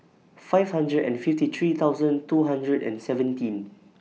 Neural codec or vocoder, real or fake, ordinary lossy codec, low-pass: none; real; none; none